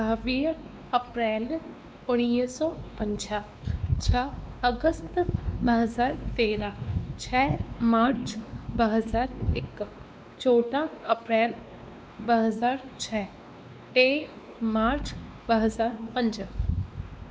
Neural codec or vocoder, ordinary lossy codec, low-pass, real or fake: codec, 16 kHz, 2 kbps, X-Codec, WavLM features, trained on Multilingual LibriSpeech; none; none; fake